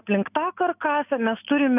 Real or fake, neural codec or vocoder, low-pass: real; none; 3.6 kHz